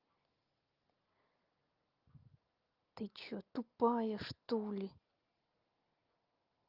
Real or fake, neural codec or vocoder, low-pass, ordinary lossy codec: real; none; 5.4 kHz; Opus, 24 kbps